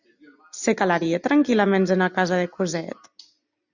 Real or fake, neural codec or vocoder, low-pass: real; none; 7.2 kHz